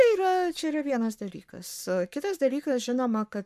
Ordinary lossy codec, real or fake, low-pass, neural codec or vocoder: MP3, 96 kbps; fake; 14.4 kHz; codec, 44.1 kHz, 7.8 kbps, Pupu-Codec